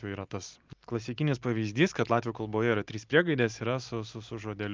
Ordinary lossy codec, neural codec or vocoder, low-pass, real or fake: Opus, 24 kbps; none; 7.2 kHz; real